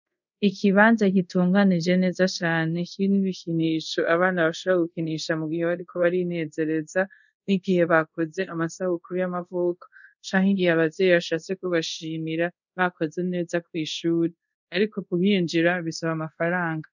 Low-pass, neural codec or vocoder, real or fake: 7.2 kHz; codec, 24 kHz, 0.5 kbps, DualCodec; fake